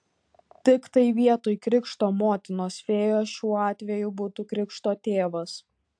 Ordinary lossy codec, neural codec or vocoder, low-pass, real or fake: AAC, 64 kbps; none; 9.9 kHz; real